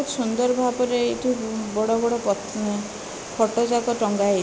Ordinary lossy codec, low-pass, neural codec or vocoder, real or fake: none; none; none; real